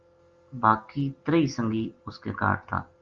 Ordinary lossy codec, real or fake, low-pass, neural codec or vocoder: Opus, 32 kbps; real; 7.2 kHz; none